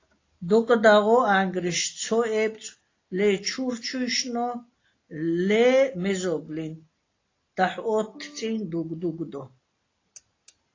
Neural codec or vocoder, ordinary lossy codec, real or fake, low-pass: none; AAC, 32 kbps; real; 7.2 kHz